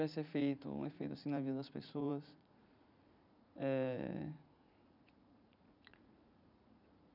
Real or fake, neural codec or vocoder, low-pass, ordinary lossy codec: fake; vocoder, 44.1 kHz, 80 mel bands, Vocos; 5.4 kHz; none